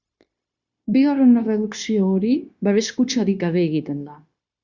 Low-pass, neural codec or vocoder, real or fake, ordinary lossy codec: 7.2 kHz; codec, 16 kHz, 0.9 kbps, LongCat-Audio-Codec; fake; Opus, 64 kbps